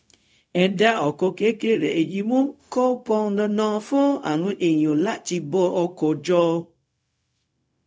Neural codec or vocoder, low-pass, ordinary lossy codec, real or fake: codec, 16 kHz, 0.4 kbps, LongCat-Audio-Codec; none; none; fake